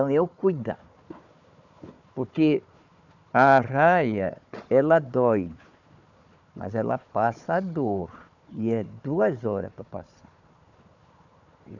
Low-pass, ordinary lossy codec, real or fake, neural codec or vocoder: 7.2 kHz; none; fake; codec, 16 kHz, 4 kbps, FunCodec, trained on Chinese and English, 50 frames a second